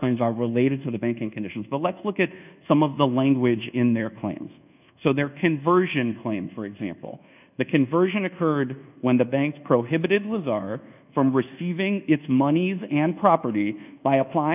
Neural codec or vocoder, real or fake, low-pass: codec, 24 kHz, 1.2 kbps, DualCodec; fake; 3.6 kHz